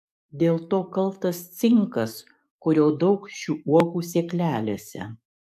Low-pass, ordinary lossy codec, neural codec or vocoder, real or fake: 14.4 kHz; AAC, 96 kbps; codec, 44.1 kHz, 7.8 kbps, DAC; fake